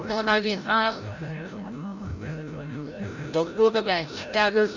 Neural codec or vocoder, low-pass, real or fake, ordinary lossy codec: codec, 16 kHz, 0.5 kbps, FreqCodec, larger model; 7.2 kHz; fake; none